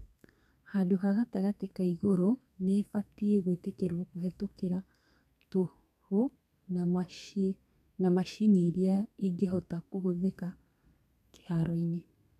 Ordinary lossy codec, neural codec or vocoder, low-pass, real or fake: none; codec, 32 kHz, 1.9 kbps, SNAC; 14.4 kHz; fake